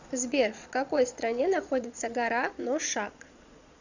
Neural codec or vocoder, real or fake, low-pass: vocoder, 22.05 kHz, 80 mel bands, Vocos; fake; 7.2 kHz